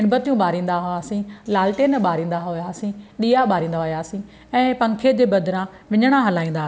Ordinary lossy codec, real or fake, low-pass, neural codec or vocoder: none; real; none; none